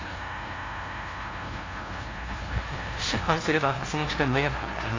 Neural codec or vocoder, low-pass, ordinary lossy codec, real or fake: codec, 16 kHz, 0.5 kbps, FunCodec, trained on LibriTTS, 25 frames a second; 7.2 kHz; AAC, 32 kbps; fake